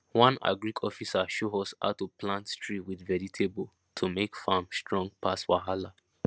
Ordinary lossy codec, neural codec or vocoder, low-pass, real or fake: none; none; none; real